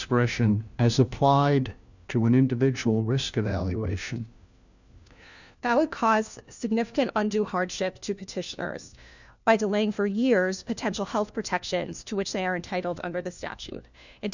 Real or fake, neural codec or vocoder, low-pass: fake; codec, 16 kHz, 1 kbps, FunCodec, trained on LibriTTS, 50 frames a second; 7.2 kHz